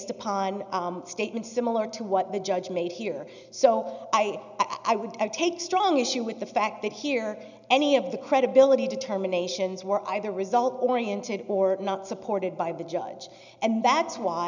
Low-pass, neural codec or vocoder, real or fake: 7.2 kHz; none; real